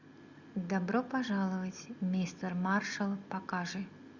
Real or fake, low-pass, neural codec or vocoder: real; 7.2 kHz; none